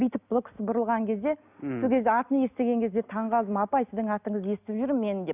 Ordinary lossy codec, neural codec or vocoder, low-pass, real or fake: none; none; 3.6 kHz; real